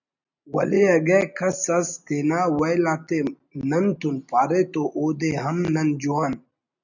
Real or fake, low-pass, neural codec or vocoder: real; 7.2 kHz; none